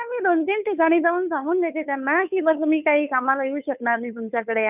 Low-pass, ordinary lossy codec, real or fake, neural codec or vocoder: 3.6 kHz; none; fake; codec, 16 kHz, 2 kbps, FunCodec, trained on Chinese and English, 25 frames a second